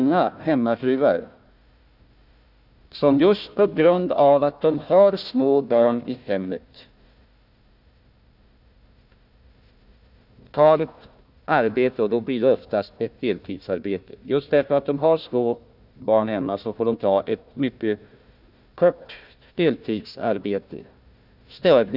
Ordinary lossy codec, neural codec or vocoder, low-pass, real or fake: none; codec, 16 kHz, 1 kbps, FunCodec, trained on Chinese and English, 50 frames a second; 5.4 kHz; fake